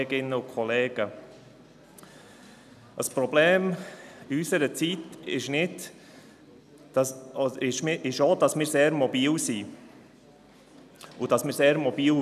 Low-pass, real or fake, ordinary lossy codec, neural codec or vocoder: 14.4 kHz; real; none; none